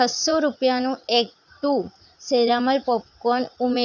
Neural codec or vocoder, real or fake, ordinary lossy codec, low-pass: vocoder, 44.1 kHz, 80 mel bands, Vocos; fake; none; 7.2 kHz